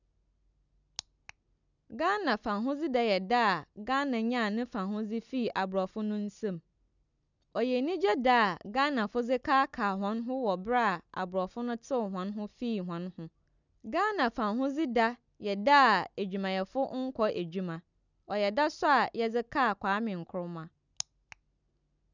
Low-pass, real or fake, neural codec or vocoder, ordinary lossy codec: 7.2 kHz; real; none; none